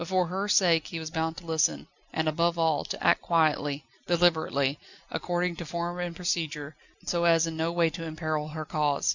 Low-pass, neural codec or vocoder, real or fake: 7.2 kHz; none; real